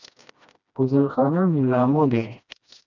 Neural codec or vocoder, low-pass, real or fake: codec, 16 kHz, 1 kbps, FreqCodec, smaller model; 7.2 kHz; fake